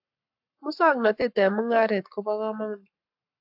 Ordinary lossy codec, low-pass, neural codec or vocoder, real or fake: AAC, 48 kbps; 5.4 kHz; codec, 44.1 kHz, 7.8 kbps, Pupu-Codec; fake